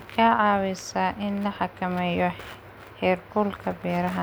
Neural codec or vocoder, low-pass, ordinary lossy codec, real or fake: none; none; none; real